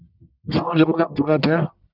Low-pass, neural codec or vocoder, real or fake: 5.4 kHz; codec, 44.1 kHz, 1.7 kbps, Pupu-Codec; fake